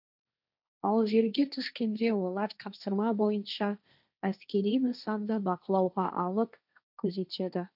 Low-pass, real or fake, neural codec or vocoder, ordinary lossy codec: 5.4 kHz; fake; codec, 16 kHz, 1.1 kbps, Voila-Tokenizer; none